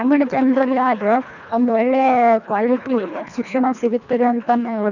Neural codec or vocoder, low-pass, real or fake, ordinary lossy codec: codec, 24 kHz, 1.5 kbps, HILCodec; 7.2 kHz; fake; none